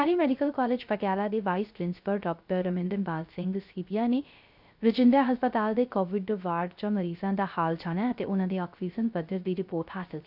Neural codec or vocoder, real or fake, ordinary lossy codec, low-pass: codec, 16 kHz, 0.3 kbps, FocalCodec; fake; none; 5.4 kHz